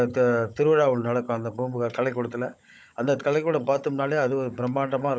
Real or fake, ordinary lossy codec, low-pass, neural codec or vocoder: fake; none; none; codec, 16 kHz, 16 kbps, FunCodec, trained on Chinese and English, 50 frames a second